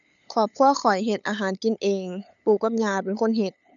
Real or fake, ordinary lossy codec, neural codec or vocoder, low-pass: fake; none; codec, 16 kHz, 8 kbps, FunCodec, trained on LibriTTS, 25 frames a second; 7.2 kHz